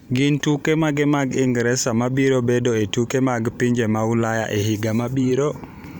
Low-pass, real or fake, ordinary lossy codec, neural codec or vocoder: none; fake; none; vocoder, 44.1 kHz, 128 mel bands every 512 samples, BigVGAN v2